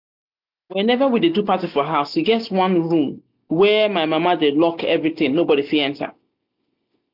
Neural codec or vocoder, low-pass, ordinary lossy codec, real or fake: none; 5.4 kHz; none; real